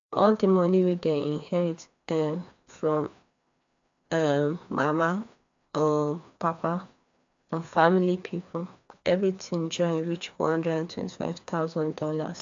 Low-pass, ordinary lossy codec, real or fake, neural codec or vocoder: 7.2 kHz; AAC, 64 kbps; fake; codec, 16 kHz, 2 kbps, FreqCodec, larger model